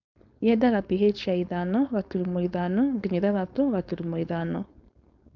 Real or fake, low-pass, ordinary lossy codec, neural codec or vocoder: fake; 7.2 kHz; none; codec, 16 kHz, 4.8 kbps, FACodec